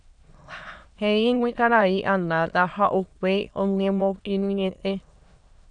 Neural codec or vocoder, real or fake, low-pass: autoencoder, 22.05 kHz, a latent of 192 numbers a frame, VITS, trained on many speakers; fake; 9.9 kHz